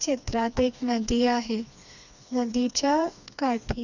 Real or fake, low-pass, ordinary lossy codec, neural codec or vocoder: fake; 7.2 kHz; none; codec, 16 kHz, 2 kbps, FreqCodec, smaller model